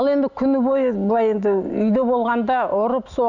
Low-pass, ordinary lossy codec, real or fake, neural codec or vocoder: 7.2 kHz; none; real; none